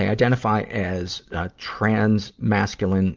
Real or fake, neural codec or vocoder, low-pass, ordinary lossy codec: real; none; 7.2 kHz; Opus, 32 kbps